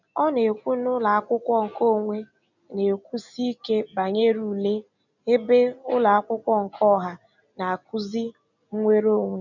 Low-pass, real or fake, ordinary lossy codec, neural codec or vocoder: 7.2 kHz; real; none; none